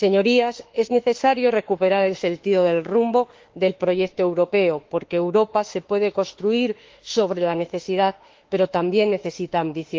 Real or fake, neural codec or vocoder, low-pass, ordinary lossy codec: fake; autoencoder, 48 kHz, 32 numbers a frame, DAC-VAE, trained on Japanese speech; 7.2 kHz; Opus, 16 kbps